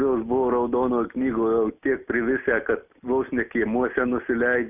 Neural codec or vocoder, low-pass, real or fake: none; 3.6 kHz; real